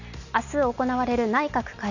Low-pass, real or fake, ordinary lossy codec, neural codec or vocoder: 7.2 kHz; real; none; none